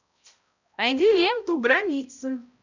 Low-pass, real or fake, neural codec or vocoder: 7.2 kHz; fake; codec, 16 kHz, 0.5 kbps, X-Codec, HuBERT features, trained on balanced general audio